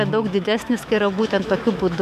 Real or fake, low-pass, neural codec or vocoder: fake; 14.4 kHz; autoencoder, 48 kHz, 128 numbers a frame, DAC-VAE, trained on Japanese speech